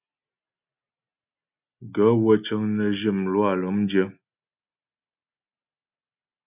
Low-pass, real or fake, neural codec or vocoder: 3.6 kHz; real; none